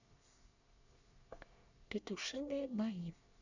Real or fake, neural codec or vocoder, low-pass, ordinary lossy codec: fake; codec, 24 kHz, 1 kbps, SNAC; 7.2 kHz; none